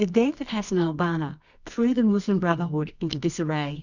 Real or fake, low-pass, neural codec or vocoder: fake; 7.2 kHz; codec, 24 kHz, 0.9 kbps, WavTokenizer, medium music audio release